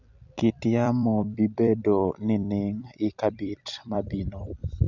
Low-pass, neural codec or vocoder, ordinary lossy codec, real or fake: 7.2 kHz; vocoder, 44.1 kHz, 128 mel bands every 256 samples, BigVGAN v2; none; fake